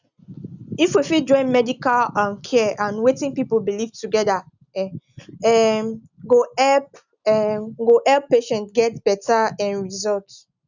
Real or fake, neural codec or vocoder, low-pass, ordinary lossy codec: real; none; 7.2 kHz; none